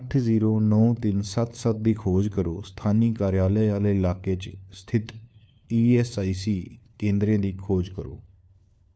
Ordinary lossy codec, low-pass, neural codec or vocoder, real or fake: none; none; codec, 16 kHz, 4 kbps, FunCodec, trained on LibriTTS, 50 frames a second; fake